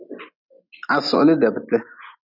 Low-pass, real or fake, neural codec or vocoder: 5.4 kHz; real; none